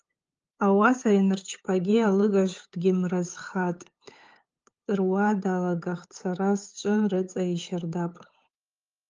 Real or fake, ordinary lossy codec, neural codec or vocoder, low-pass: fake; Opus, 24 kbps; codec, 16 kHz, 8 kbps, FunCodec, trained on LibriTTS, 25 frames a second; 7.2 kHz